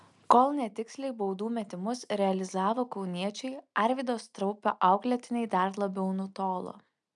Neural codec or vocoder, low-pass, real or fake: none; 10.8 kHz; real